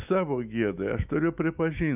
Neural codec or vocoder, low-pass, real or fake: autoencoder, 48 kHz, 128 numbers a frame, DAC-VAE, trained on Japanese speech; 3.6 kHz; fake